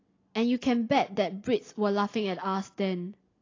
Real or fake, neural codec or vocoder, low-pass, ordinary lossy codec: real; none; 7.2 kHz; AAC, 32 kbps